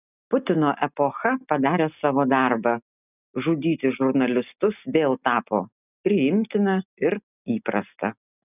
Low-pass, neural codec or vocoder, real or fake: 3.6 kHz; none; real